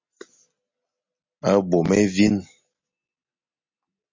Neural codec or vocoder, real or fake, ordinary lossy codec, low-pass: none; real; MP3, 32 kbps; 7.2 kHz